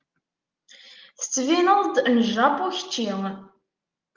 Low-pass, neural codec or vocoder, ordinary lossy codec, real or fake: 7.2 kHz; none; Opus, 24 kbps; real